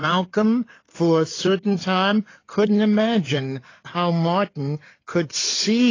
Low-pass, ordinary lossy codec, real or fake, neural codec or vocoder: 7.2 kHz; AAC, 32 kbps; fake; codec, 16 kHz in and 24 kHz out, 2.2 kbps, FireRedTTS-2 codec